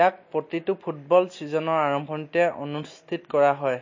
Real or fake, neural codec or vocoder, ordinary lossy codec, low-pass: real; none; MP3, 32 kbps; 7.2 kHz